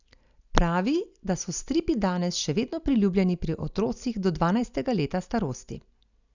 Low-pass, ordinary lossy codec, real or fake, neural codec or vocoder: 7.2 kHz; none; real; none